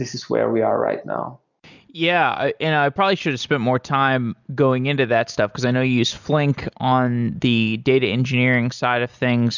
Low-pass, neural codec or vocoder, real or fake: 7.2 kHz; none; real